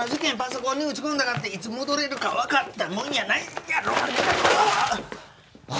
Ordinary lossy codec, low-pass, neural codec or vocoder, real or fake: none; none; none; real